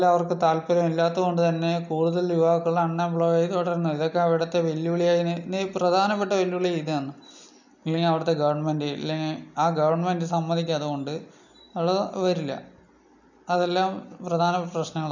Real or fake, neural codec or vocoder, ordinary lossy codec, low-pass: real; none; none; 7.2 kHz